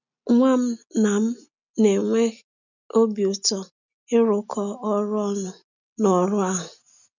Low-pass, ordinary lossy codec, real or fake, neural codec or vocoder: 7.2 kHz; none; real; none